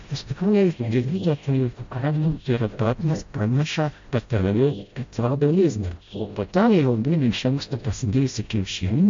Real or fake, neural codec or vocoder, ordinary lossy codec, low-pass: fake; codec, 16 kHz, 0.5 kbps, FreqCodec, smaller model; MP3, 48 kbps; 7.2 kHz